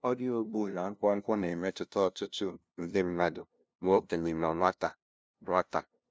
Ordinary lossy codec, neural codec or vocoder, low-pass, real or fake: none; codec, 16 kHz, 0.5 kbps, FunCodec, trained on LibriTTS, 25 frames a second; none; fake